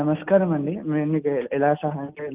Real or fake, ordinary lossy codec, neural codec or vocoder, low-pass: real; Opus, 32 kbps; none; 3.6 kHz